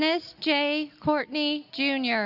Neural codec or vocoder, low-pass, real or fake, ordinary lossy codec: none; 5.4 kHz; real; Opus, 64 kbps